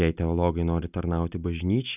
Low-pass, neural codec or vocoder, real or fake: 3.6 kHz; none; real